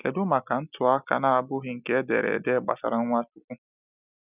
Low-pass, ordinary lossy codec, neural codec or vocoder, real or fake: 3.6 kHz; none; none; real